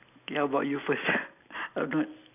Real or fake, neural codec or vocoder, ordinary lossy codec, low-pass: real; none; none; 3.6 kHz